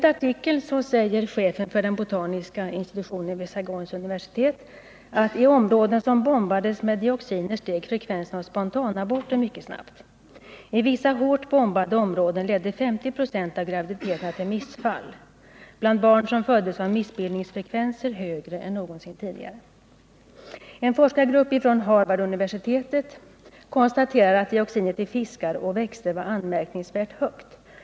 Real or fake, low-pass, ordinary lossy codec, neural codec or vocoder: real; none; none; none